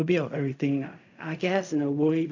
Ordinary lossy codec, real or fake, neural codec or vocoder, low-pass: none; fake; codec, 16 kHz in and 24 kHz out, 0.4 kbps, LongCat-Audio-Codec, fine tuned four codebook decoder; 7.2 kHz